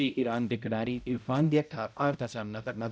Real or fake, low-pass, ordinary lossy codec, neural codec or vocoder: fake; none; none; codec, 16 kHz, 0.5 kbps, X-Codec, HuBERT features, trained on balanced general audio